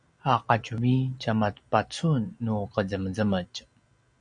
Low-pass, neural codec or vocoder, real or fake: 9.9 kHz; none; real